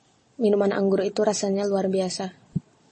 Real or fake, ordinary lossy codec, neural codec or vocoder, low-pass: real; MP3, 32 kbps; none; 10.8 kHz